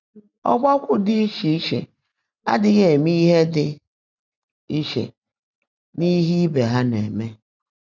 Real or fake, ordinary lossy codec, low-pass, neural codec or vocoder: real; none; 7.2 kHz; none